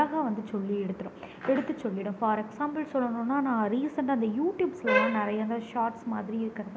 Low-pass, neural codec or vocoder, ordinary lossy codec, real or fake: none; none; none; real